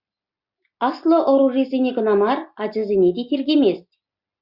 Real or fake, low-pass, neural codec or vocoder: real; 5.4 kHz; none